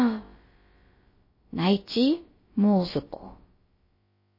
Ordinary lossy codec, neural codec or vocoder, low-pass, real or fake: MP3, 24 kbps; codec, 16 kHz, about 1 kbps, DyCAST, with the encoder's durations; 5.4 kHz; fake